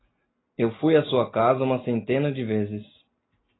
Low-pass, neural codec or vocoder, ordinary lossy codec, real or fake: 7.2 kHz; none; AAC, 16 kbps; real